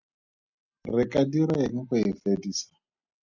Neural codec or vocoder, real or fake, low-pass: none; real; 7.2 kHz